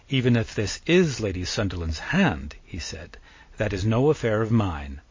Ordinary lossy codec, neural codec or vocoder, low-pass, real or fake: MP3, 32 kbps; none; 7.2 kHz; real